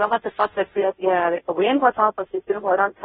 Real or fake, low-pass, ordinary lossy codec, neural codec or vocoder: fake; 7.2 kHz; AAC, 16 kbps; codec, 16 kHz, 0.5 kbps, FunCodec, trained on Chinese and English, 25 frames a second